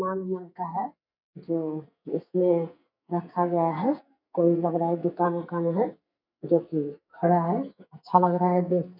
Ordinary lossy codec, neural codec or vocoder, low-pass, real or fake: none; codec, 44.1 kHz, 2.6 kbps, SNAC; 5.4 kHz; fake